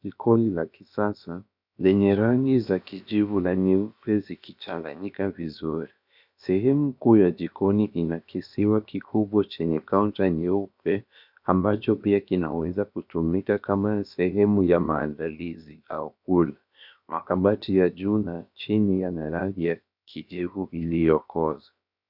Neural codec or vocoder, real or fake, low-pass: codec, 16 kHz, about 1 kbps, DyCAST, with the encoder's durations; fake; 5.4 kHz